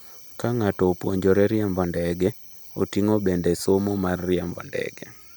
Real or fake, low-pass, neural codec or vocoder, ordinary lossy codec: real; none; none; none